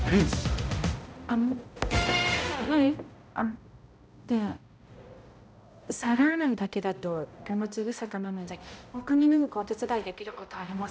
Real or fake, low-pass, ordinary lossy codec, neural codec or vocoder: fake; none; none; codec, 16 kHz, 0.5 kbps, X-Codec, HuBERT features, trained on balanced general audio